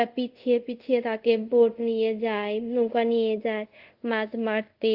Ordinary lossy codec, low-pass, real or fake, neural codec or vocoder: Opus, 24 kbps; 5.4 kHz; fake; codec, 24 kHz, 0.5 kbps, DualCodec